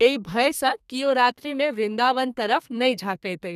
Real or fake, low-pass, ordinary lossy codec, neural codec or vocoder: fake; 14.4 kHz; none; codec, 32 kHz, 1.9 kbps, SNAC